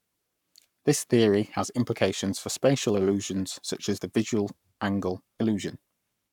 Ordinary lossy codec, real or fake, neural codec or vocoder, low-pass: none; fake; codec, 44.1 kHz, 7.8 kbps, Pupu-Codec; 19.8 kHz